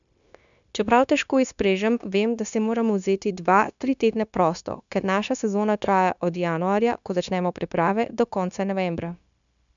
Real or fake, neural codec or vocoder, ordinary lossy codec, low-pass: fake; codec, 16 kHz, 0.9 kbps, LongCat-Audio-Codec; none; 7.2 kHz